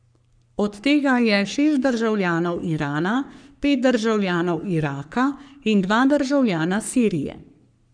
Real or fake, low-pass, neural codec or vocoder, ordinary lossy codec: fake; 9.9 kHz; codec, 44.1 kHz, 3.4 kbps, Pupu-Codec; none